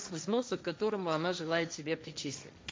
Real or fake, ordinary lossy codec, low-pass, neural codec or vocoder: fake; none; none; codec, 16 kHz, 1.1 kbps, Voila-Tokenizer